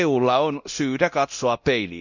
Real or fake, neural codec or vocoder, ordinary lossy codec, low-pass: fake; codec, 24 kHz, 0.9 kbps, DualCodec; none; 7.2 kHz